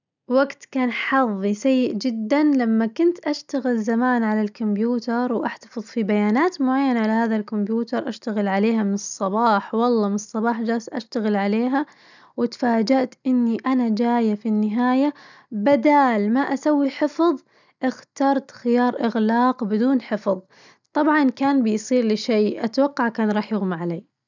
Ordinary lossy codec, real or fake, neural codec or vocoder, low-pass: none; real; none; 7.2 kHz